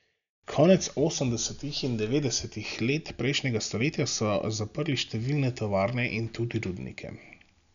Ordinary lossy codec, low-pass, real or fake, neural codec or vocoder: none; 7.2 kHz; real; none